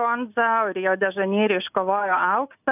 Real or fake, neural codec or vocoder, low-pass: real; none; 3.6 kHz